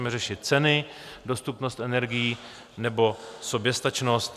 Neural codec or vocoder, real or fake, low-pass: none; real; 14.4 kHz